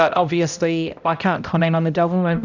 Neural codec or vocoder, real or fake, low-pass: codec, 16 kHz, 0.5 kbps, X-Codec, HuBERT features, trained on balanced general audio; fake; 7.2 kHz